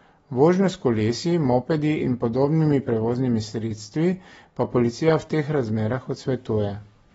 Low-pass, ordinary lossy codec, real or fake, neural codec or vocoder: 10.8 kHz; AAC, 24 kbps; real; none